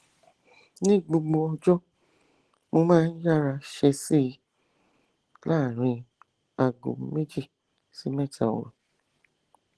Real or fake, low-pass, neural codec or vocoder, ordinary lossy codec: real; 10.8 kHz; none; Opus, 16 kbps